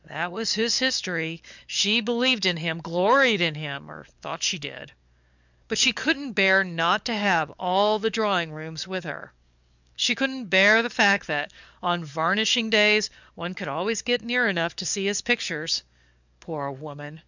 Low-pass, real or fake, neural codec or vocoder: 7.2 kHz; fake; codec, 44.1 kHz, 7.8 kbps, DAC